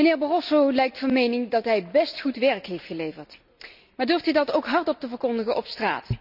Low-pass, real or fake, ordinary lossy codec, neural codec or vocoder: 5.4 kHz; real; none; none